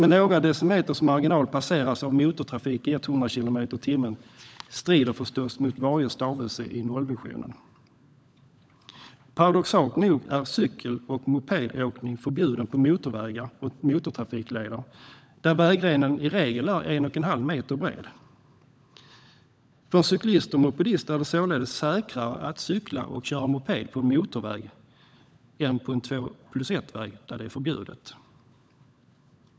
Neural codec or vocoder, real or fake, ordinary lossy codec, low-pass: codec, 16 kHz, 16 kbps, FunCodec, trained on LibriTTS, 50 frames a second; fake; none; none